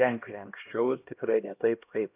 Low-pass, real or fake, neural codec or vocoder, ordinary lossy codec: 3.6 kHz; fake; codec, 16 kHz, 1 kbps, X-Codec, HuBERT features, trained on LibriSpeech; AAC, 32 kbps